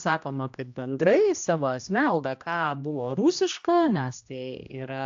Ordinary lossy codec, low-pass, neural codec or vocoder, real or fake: AAC, 64 kbps; 7.2 kHz; codec, 16 kHz, 1 kbps, X-Codec, HuBERT features, trained on general audio; fake